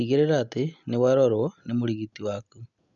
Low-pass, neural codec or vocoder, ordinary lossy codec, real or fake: 7.2 kHz; none; none; real